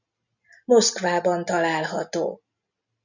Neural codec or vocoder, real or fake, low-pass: none; real; 7.2 kHz